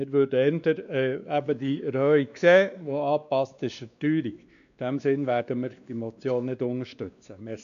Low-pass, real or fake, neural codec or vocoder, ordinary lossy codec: 7.2 kHz; fake; codec, 16 kHz, 2 kbps, X-Codec, WavLM features, trained on Multilingual LibriSpeech; none